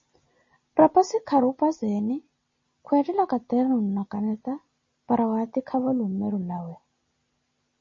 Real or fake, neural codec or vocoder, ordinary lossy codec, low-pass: real; none; MP3, 32 kbps; 7.2 kHz